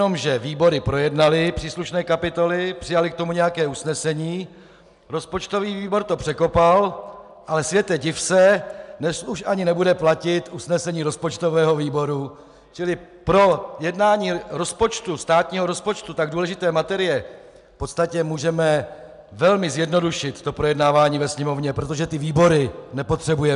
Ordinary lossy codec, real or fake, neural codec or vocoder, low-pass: MP3, 96 kbps; real; none; 10.8 kHz